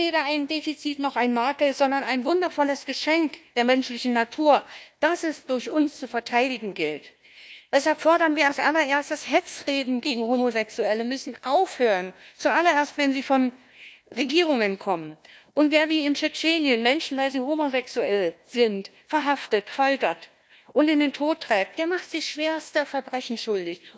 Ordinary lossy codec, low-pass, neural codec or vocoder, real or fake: none; none; codec, 16 kHz, 1 kbps, FunCodec, trained on Chinese and English, 50 frames a second; fake